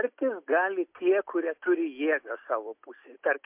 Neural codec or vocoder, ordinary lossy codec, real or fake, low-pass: none; MP3, 32 kbps; real; 3.6 kHz